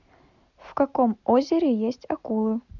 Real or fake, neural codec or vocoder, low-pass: real; none; 7.2 kHz